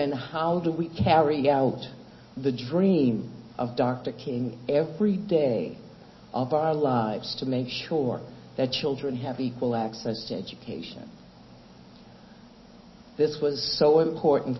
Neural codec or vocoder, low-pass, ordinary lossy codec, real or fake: none; 7.2 kHz; MP3, 24 kbps; real